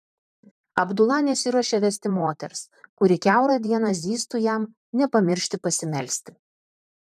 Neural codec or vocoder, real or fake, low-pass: vocoder, 44.1 kHz, 128 mel bands, Pupu-Vocoder; fake; 14.4 kHz